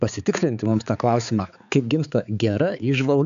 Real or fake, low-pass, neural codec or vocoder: fake; 7.2 kHz; codec, 16 kHz, 4 kbps, X-Codec, HuBERT features, trained on balanced general audio